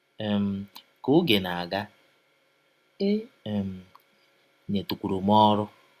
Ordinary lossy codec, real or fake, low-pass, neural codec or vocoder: Opus, 64 kbps; real; 14.4 kHz; none